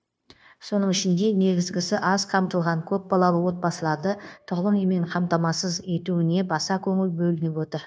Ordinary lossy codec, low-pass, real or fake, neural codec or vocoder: none; none; fake; codec, 16 kHz, 0.9 kbps, LongCat-Audio-Codec